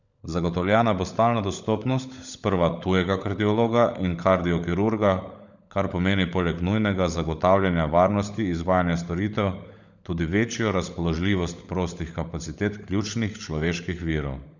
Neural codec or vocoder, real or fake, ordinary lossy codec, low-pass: codec, 16 kHz, 16 kbps, FunCodec, trained on LibriTTS, 50 frames a second; fake; none; 7.2 kHz